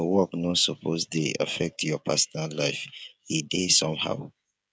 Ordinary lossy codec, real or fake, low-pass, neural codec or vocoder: none; fake; none; codec, 16 kHz, 16 kbps, FreqCodec, smaller model